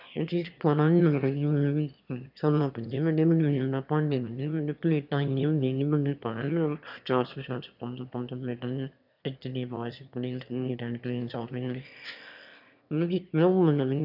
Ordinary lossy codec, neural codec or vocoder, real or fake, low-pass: none; autoencoder, 22.05 kHz, a latent of 192 numbers a frame, VITS, trained on one speaker; fake; 5.4 kHz